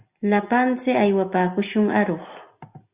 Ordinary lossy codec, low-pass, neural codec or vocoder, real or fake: Opus, 64 kbps; 3.6 kHz; none; real